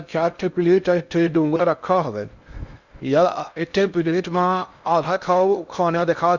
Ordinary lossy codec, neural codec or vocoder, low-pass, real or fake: Opus, 64 kbps; codec, 16 kHz in and 24 kHz out, 0.6 kbps, FocalCodec, streaming, 2048 codes; 7.2 kHz; fake